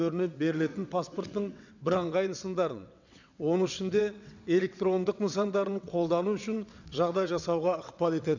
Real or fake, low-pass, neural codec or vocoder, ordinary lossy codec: fake; 7.2 kHz; vocoder, 22.05 kHz, 80 mel bands, WaveNeXt; none